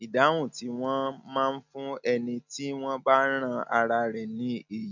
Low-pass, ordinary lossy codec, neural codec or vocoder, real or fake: 7.2 kHz; none; none; real